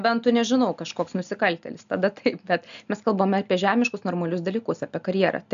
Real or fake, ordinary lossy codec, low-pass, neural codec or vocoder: real; AAC, 96 kbps; 7.2 kHz; none